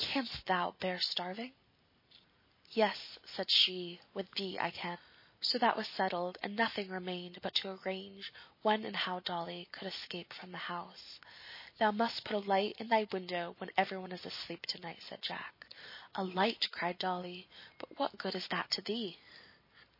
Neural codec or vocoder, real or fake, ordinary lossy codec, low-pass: none; real; MP3, 24 kbps; 5.4 kHz